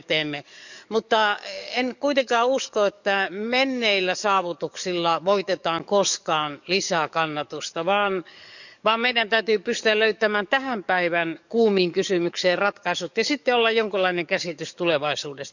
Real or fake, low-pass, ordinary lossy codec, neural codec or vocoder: fake; 7.2 kHz; none; codec, 44.1 kHz, 7.8 kbps, DAC